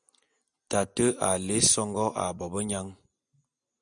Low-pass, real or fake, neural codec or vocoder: 9.9 kHz; real; none